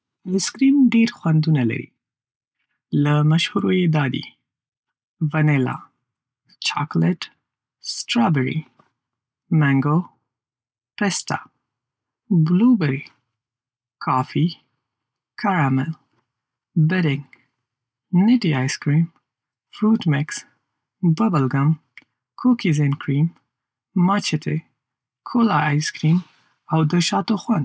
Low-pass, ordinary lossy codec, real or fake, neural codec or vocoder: none; none; real; none